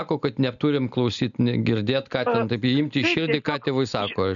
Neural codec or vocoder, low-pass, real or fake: none; 7.2 kHz; real